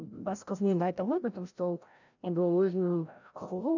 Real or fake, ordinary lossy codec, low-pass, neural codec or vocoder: fake; none; 7.2 kHz; codec, 16 kHz, 0.5 kbps, FreqCodec, larger model